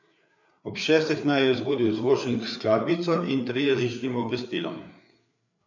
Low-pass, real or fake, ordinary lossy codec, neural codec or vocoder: 7.2 kHz; fake; none; codec, 16 kHz, 4 kbps, FreqCodec, larger model